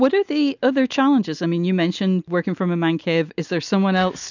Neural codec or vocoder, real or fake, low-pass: none; real; 7.2 kHz